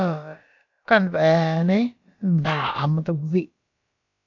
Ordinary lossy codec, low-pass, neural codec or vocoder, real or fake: AAC, 48 kbps; 7.2 kHz; codec, 16 kHz, about 1 kbps, DyCAST, with the encoder's durations; fake